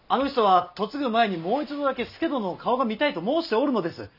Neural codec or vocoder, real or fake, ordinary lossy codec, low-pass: none; real; none; 5.4 kHz